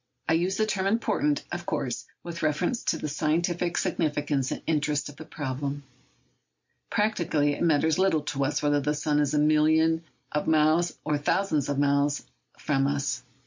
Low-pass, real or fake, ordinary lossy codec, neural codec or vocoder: 7.2 kHz; real; MP3, 48 kbps; none